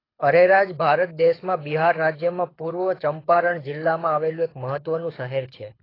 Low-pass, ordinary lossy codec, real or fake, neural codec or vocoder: 5.4 kHz; AAC, 24 kbps; fake; codec, 24 kHz, 6 kbps, HILCodec